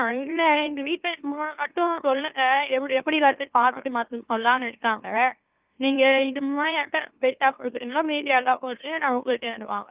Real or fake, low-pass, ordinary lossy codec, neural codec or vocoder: fake; 3.6 kHz; Opus, 24 kbps; autoencoder, 44.1 kHz, a latent of 192 numbers a frame, MeloTTS